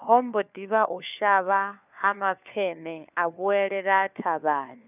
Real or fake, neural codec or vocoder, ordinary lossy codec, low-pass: fake; codec, 16 kHz, 4 kbps, FunCodec, trained on LibriTTS, 50 frames a second; none; 3.6 kHz